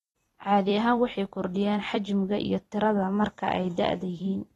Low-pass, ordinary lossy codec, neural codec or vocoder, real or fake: 19.8 kHz; AAC, 32 kbps; vocoder, 44.1 kHz, 128 mel bands every 256 samples, BigVGAN v2; fake